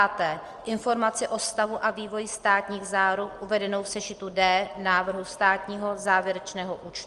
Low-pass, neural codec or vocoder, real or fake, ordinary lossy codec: 10.8 kHz; none; real; Opus, 24 kbps